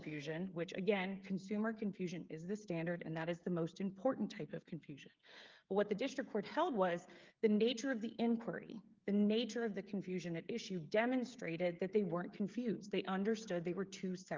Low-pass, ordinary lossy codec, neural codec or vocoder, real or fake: 7.2 kHz; Opus, 32 kbps; codec, 16 kHz, 16 kbps, FreqCodec, smaller model; fake